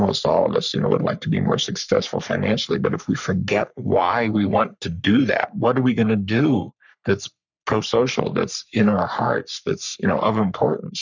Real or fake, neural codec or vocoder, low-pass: fake; codec, 44.1 kHz, 3.4 kbps, Pupu-Codec; 7.2 kHz